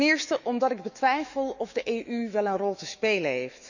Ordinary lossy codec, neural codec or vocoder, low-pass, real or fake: none; autoencoder, 48 kHz, 128 numbers a frame, DAC-VAE, trained on Japanese speech; 7.2 kHz; fake